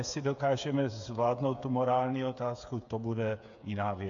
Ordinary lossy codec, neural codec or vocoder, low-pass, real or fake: AAC, 64 kbps; codec, 16 kHz, 8 kbps, FreqCodec, smaller model; 7.2 kHz; fake